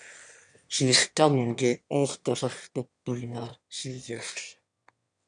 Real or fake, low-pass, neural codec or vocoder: fake; 9.9 kHz; autoencoder, 22.05 kHz, a latent of 192 numbers a frame, VITS, trained on one speaker